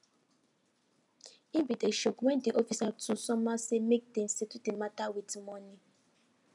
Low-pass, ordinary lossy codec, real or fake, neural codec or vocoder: 10.8 kHz; MP3, 96 kbps; real; none